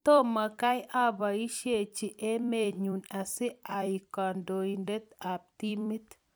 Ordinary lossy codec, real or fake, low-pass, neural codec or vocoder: none; fake; none; vocoder, 44.1 kHz, 128 mel bands every 256 samples, BigVGAN v2